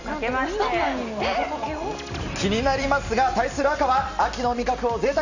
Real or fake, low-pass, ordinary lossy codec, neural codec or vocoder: real; 7.2 kHz; none; none